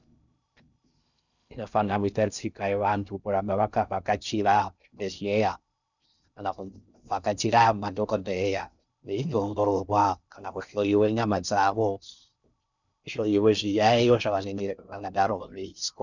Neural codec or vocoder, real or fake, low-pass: codec, 16 kHz in and 24 kHz out, 0.6 kbps, FocalCodec, streaming, 2048 codes; fake; 7.2 kHz